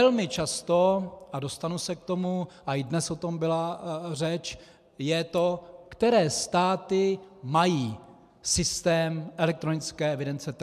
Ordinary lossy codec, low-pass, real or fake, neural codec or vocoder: MP3, 96 kbps; 14.4 kHz; real; none